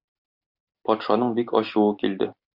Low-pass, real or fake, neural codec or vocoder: 5.4 kHz; real; none